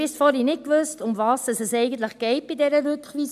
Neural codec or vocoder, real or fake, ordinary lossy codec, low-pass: none; real; none; 14.4 kHz